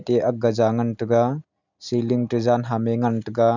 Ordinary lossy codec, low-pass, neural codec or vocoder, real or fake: none; 7.2 kHz; none; real